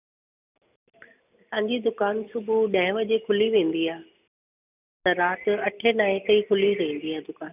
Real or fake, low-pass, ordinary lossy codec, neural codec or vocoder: real; 3.6 kHz; none; none